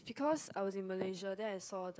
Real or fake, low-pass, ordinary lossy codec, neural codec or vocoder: fake; none; none; codec, 16 kHz, 16 kbps, FreqCodec, smaller model